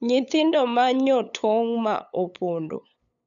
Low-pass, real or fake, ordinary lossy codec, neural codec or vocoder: 7.2 kHz; fake; none; codec, 16 kHz, 8 kbps, FunCodec, trained on LibriTTS, 25 frames a second